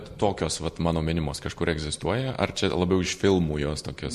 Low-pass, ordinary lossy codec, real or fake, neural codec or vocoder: 14.4 kHz; MP3, 64 kbps; real; none